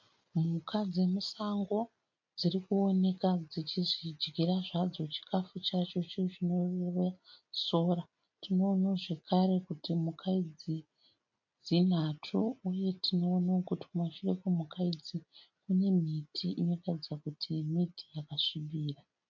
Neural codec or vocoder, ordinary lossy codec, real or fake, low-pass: none; MP3, 48 kbps; real; 7.2 kHz